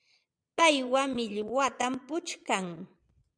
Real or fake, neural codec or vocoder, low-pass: fake; vocoder, 22.05 kHz, 80 mel bands, Vocos; 9.9 kHz